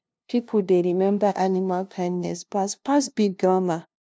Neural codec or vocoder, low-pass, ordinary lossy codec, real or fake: codec, 16 kHz, 0.5 kbps, FunCodec, trained on LibriTTS, 25 frames a second; none; none; fake